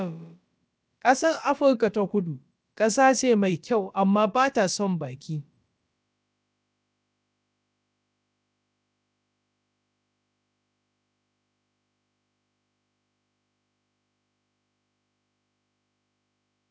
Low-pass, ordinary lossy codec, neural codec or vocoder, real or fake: none; none; codec, 16 kHz, about 1 kbps, DyCAST, with the encoder's durations; fake